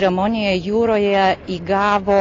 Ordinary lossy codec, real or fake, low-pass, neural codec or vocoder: AAC, 32 kbps; real; 7.2 kHz; none